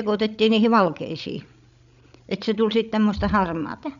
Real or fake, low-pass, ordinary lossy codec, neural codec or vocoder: fake; 7.2 kHz; none; codec, 16 kHz, 16 kbps, FreqCodec, larger model